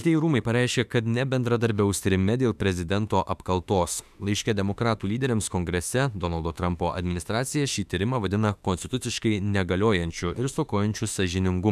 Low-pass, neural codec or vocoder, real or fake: 14.4 kHz; autoencoder, 48 kHz, 32 numbers a frame, DAC-VAE, trained on Japanese speech; fake